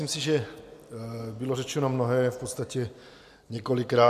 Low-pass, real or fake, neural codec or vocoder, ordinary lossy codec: 14.4 kHz; real; none; MP3, 96 kbps